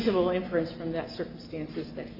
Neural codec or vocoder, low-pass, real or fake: none; 5.4 kHz; real